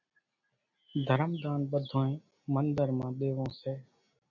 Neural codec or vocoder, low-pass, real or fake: none; 7.2 kHz; real